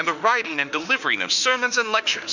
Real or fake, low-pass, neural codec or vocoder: fake; 7.2 kHz; autoencoder, 48 kHz, 32 numbers a frame, DAC-VAE, trained on Japanese speech